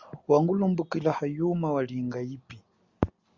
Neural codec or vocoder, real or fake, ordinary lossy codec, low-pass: none; real; Opus, 64 kbps; 7.2 kHz